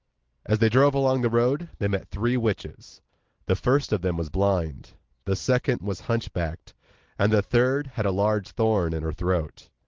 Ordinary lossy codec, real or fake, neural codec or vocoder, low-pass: Opus, 16 kbps; real; none; 7.2 kHz